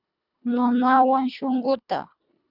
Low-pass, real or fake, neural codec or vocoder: 5.4 kHz; fake; codec, 24 kHz, 1.5 kbps, HILCodec